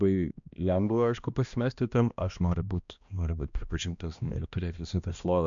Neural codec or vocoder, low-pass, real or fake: codec, 16 kHz, 1 kbps, X-Codec, HuBERT features, trained on balanced general audio; 7.2 kHz; fake